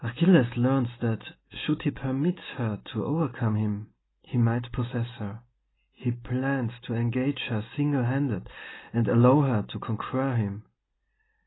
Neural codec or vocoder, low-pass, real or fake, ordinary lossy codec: none; 7.2 kHz; real; AAC, 16 kbps